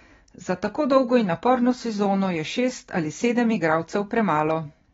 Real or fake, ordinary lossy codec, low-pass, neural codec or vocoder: real; AAC, 24 kbps; 7.2 kHz; none